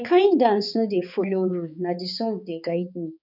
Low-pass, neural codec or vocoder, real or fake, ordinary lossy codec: 5.4 kHz; codec, 16 kHz, 4 kbps, X-Codec, HuBERT features, trained on balanced general audio; fake; none